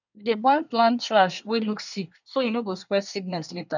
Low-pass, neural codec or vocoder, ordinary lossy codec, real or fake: 7.2 kHz; codec, 24 kHz, 1 kbps, SNAC; none; fake